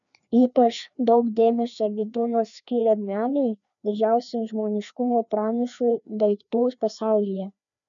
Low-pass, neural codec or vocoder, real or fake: 7.2 kHz; codec, 16 kHz, 2 kbps, FreqCodec, larger model; fake